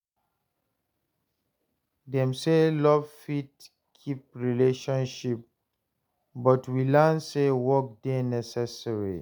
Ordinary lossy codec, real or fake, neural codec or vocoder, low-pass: none; real; none; none